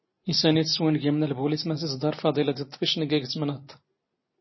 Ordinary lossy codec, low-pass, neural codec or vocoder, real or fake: MP3, 24 kbps; 7.2 kHz; none; real